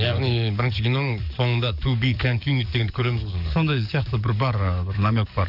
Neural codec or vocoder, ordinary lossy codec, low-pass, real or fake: codec, 44.1 kHz, 7.8 kbps, DAC; none; 5.4 kHz; fake